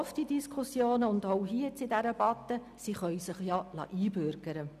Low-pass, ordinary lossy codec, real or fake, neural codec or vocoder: 14.4 kHz; none; real; none